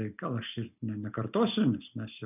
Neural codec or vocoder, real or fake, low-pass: none; real; 3.6 kHz